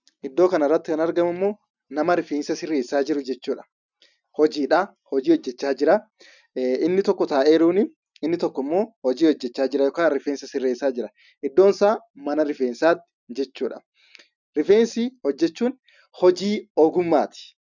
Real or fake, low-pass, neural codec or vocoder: real; 7.2 kHz; none